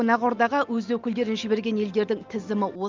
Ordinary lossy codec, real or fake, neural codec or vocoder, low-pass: Opus, 24 kbps; real; none; 7.2 kHz